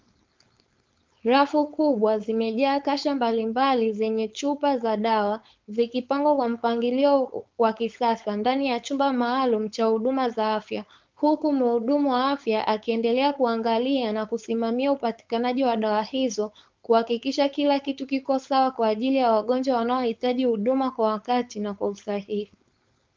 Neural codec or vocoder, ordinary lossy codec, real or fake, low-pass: codec, 16 kHz, 4.8 kbps, FACodec; Opus, 24 kbps; fake; 7.2 kHz